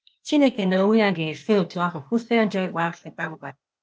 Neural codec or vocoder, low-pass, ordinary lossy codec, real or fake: codec, 16 kHz, 0.8 kbps, ZipCodec; none; none; fake